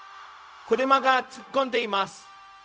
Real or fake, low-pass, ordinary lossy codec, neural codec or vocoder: fake; none; none; codec, 16 kHz, 0.4 kbps, LongCat-Audio-Codec